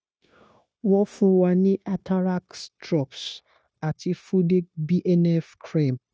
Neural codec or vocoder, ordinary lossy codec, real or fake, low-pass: codec, 16 kHz, 0.9 kbps, LongCat-Audio-Codec; none; fake; none